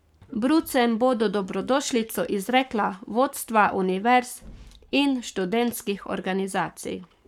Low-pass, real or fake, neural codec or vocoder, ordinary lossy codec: 19.8 kHz; fake; codec, 44.1 kHz, 7.8 kbps, Pupu-Codec; none